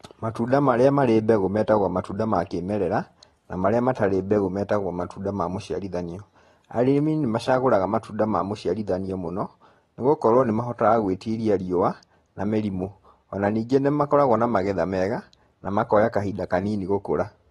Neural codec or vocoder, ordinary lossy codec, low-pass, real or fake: none; AAC, 32 kbps; 19.8 kHz; real